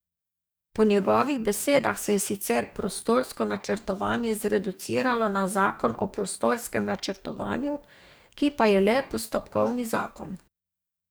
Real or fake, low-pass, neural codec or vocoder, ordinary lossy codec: fake; none; codec, 44.1 kHz, 2.6 kbps, DAC; none